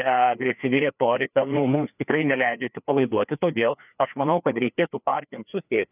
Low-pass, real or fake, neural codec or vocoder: 3.6 kHz; fake; codec, 16 kHz, 2 kbps, FreqCodec, larger model